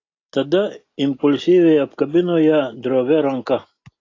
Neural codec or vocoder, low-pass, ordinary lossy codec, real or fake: none; 7.2 kHz; AAC, 32 kbps; real